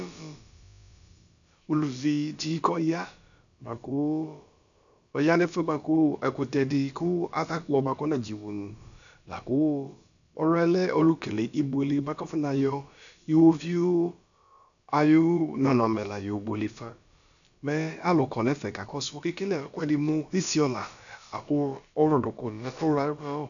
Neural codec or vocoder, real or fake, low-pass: codec, 16 kHz, about 1 kbps, DyCAST, with the encoder's durations; fake; 7.2 kHz